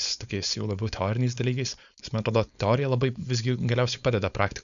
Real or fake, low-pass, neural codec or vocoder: fake; 7.2 kHz; codec, 16 kHz, 4.8 kbps, FACodec